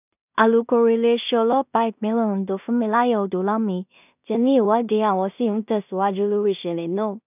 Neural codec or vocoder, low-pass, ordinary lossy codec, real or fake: codec, 16 kHz in and 24 kHz out, 0.4 kbps, LongCat-Audio-Codec, two codebook decoder; 3.6 kHz; none; fake